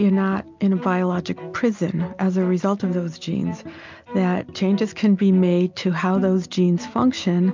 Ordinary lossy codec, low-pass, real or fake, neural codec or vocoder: MP3, 64 kbps; 7.2 kHz; real; none